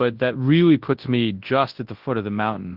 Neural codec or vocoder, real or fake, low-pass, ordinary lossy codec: codec, 24 kHz, 0.9 kbps, WavTokenizer, large speech release; fake; 5.4 kHz; Opus, 16 kbps